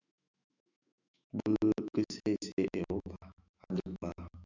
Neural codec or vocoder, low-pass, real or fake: autoencoder, 48 kHz, 128 numbers a frame, DAC-VAE, trained on Japanese speech; 7.2 kHz; fake